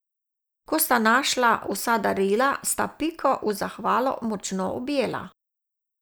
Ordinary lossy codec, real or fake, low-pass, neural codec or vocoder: none; real; none; none